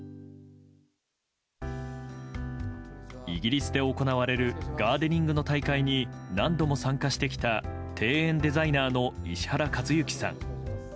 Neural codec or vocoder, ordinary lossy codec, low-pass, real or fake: none; none; none; real